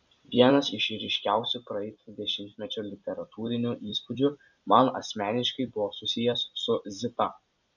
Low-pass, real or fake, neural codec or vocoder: 7.2 kHz; fake; vocoder, 44.1 kHz, 128 mel bands every 256 samples, BigVGAN v2